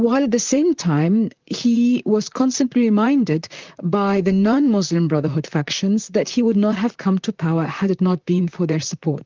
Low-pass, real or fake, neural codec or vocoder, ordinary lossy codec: 7.2 kHz; fake; vocoder, 44.1 kHz, 128 mel bands, Pupu-Vocoder; Opus, 32 kbps